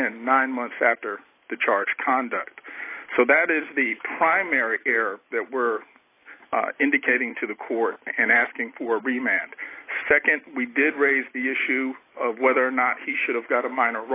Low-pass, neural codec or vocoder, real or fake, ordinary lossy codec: 3.6 kHz; none; real; AAC, 24 kbps